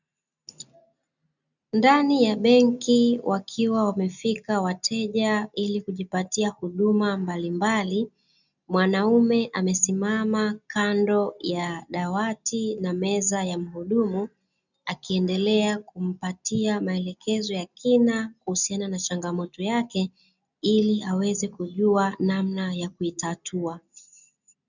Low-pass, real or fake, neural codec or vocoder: 7.2 kHz; real; none